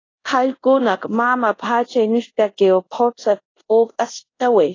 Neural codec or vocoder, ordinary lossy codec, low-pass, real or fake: codec, 24 kHz, 0.5 kbps, DualCodec; AAC, 32 kbps; 7.2 kHz; fake